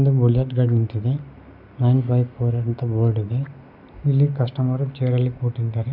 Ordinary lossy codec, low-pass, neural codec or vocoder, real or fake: none; 5.4 kHz; none; real